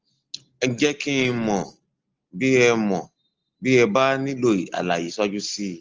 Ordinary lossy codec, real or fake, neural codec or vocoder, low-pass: Opus, 16 kbps; real; none; 7.2 kHz